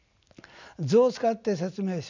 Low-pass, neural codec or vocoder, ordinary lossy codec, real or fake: 7.2 kHz; none; none; real